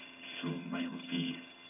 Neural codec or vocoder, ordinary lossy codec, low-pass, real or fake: vocoder, 22.05 kHz, 80 mel bands, HiFi-GAN; none; 3.6 kHz; fake